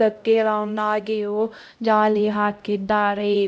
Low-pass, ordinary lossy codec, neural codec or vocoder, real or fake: none; none; codec, 16 kHz, 0.5 kbps, X-Codec, HuBERT features, trained on LibriSpeech; fake